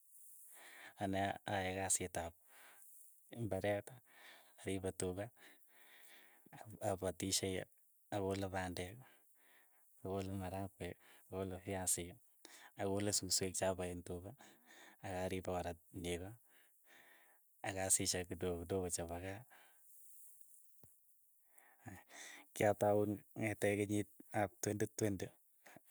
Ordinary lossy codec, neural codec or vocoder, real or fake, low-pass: none; none; real; none